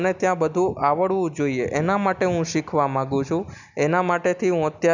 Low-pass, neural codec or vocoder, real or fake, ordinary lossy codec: 7.2 kHz; none; real; none